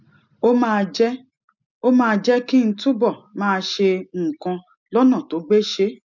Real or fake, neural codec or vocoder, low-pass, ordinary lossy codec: real; none; 7.2 kHz; none